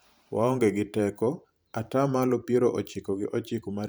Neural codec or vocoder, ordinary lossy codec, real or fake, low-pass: vocoder, 44.1 kHz, 128 mel bands every 512 samples, BigVGAN v2; none; fake; none